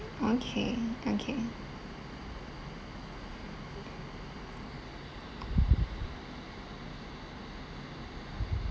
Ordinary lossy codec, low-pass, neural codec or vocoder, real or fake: none; none; none; real